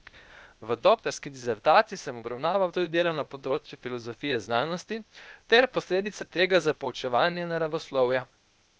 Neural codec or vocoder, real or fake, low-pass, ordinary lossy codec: codec, 16 kHz, 0.8 kbps, ZipCodec; fake; none; none